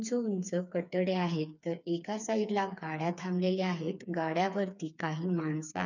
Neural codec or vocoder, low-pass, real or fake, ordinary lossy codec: codec, 16 kHz, 4 kbps, FreqCodec, smaller model; 7.2 kHz; fake; none